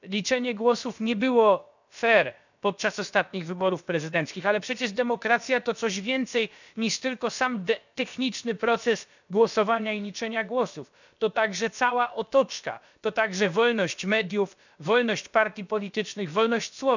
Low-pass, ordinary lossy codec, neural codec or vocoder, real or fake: 7.2 kHz; none; codec, 16 kHz, about 1 kbps, DyCAST, with the encoder's durations; fake